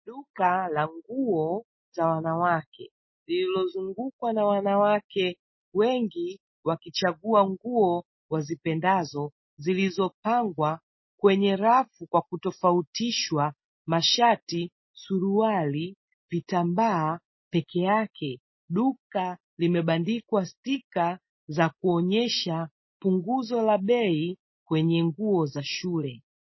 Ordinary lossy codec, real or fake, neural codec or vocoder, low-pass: MP3, 24 kbps; real; none; 7.2 kHz